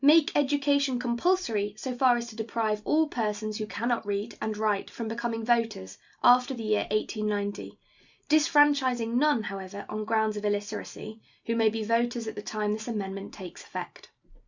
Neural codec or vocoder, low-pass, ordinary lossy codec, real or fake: none; 7.2 kHz; Opus, 64 kbps; real